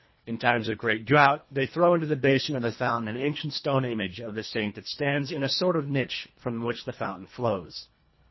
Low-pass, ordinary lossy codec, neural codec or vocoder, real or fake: 7.2 kHz; MP3, 24 kbps; codec, 24 kHz, 1.5 kbps, HILCodec; fake